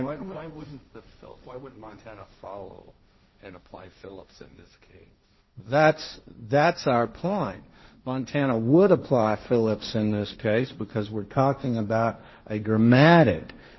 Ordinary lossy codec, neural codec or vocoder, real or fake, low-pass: MP3, 24 kbps; codec, 16 kHz, 1.1 kbps, Voila-Tokenizer; fake; 7.2 kHz